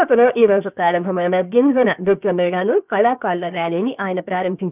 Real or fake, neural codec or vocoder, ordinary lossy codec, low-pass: fake; codec, 16 kHz, 0.7 kbps, FocalCodec; none; 3.6 kHz